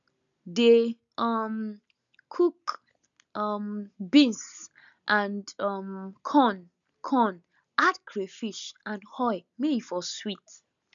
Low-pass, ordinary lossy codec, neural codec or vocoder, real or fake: 7.2 kHz; none; none; real